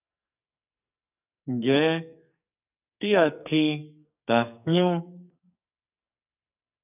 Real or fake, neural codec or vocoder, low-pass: fake; codec, 44.1 kHz, 2.6 kbps, SNAC; 3.6 kHz